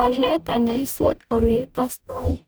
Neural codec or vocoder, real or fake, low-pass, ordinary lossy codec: codec, 44.1 kHz, 0.9 kbps, DAC; fake; none; none